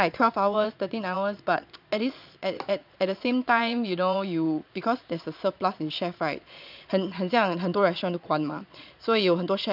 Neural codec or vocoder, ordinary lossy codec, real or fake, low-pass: vocoder, 22.05 kHz, 80 mel bands, Vocos; none; fake; 5.4 kHz